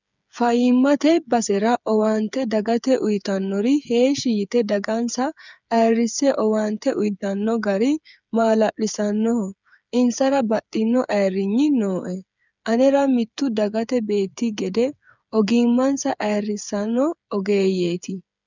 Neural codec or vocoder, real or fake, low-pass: codec, 16 kHz, 8 kbps, FreqCodec, smaller model; fake; 7.2 kHz